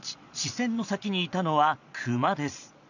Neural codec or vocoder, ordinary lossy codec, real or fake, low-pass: vocoder, 22.05 kHz, 80 mel bands, Vocos; none; fake; 7.2 kHz